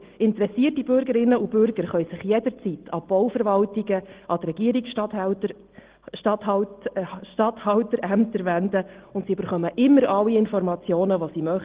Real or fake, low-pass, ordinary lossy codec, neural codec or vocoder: real; 3.6 kHz; Opus, 16 kbps; none